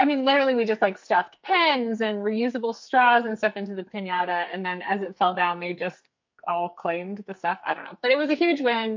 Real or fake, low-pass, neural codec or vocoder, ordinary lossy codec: fake; 7.2 kHz; codec, 44.1 kHz, 2.6 kbps, SNAC; MP3, 48 kbps